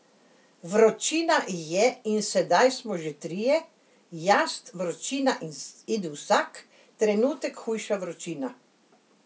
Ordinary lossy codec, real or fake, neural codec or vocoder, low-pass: none; real; none; none